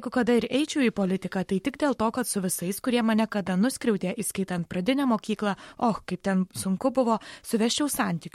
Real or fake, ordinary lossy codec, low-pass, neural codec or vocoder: fake; MP3, 64 kbps; 19.8 kHz; codec, 44.1 kHz, 7.8 kbps, Pupu-Codec